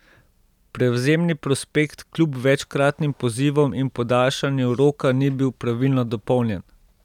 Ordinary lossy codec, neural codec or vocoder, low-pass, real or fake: none; none; 19.8 kHz; real